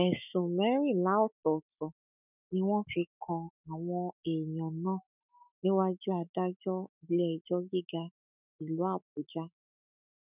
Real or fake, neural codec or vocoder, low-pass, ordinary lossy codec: fake; autoencoder, 48 kHz, 128 numbers a frame, DAC-VAE, trained on Japanese speech; 3.6 kHz; none